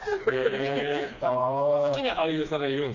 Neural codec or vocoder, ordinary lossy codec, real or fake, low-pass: codec, 16 kHz, 2 kbps, FreqCodec, smaller model; none; fake; 7.2 kHz